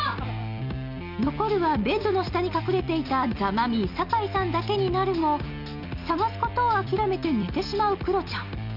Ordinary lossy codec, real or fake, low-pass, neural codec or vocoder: none; real; 5.4 kHz; none